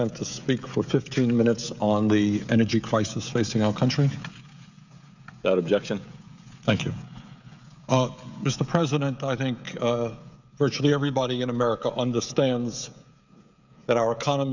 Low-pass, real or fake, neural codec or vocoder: 7.2 kHz; fake; codec, 16 kHz, 16 kbps, FreqCodec, smaller model